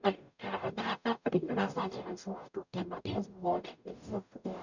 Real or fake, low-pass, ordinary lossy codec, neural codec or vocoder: fake; 7.2 kHz; none; codec, 44.1 kHz, 0.9 kbps, DAC